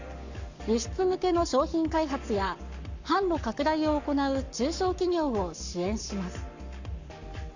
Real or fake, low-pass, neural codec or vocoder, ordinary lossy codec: fake; 7.2 kHz; codec, 44.1 kHz, 7.8 kbps, Pupu-Codec; none